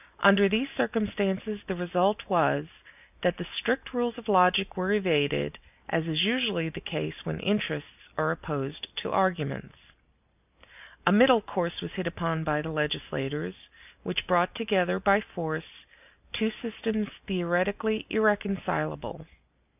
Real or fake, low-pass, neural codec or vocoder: real; 3.6 kHz; none